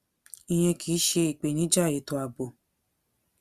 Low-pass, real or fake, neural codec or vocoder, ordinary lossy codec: 14.4 kHz; real; none; Opus, 64 kbps